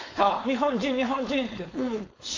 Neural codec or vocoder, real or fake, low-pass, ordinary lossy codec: codec, 16 kHz, 4.8 kbps, FACodec; fake; 7.2 kHz; AAC, 32 kbps